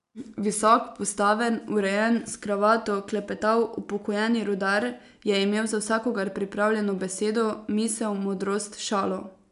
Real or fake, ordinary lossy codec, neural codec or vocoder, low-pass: real; none; none; 10.8 kHz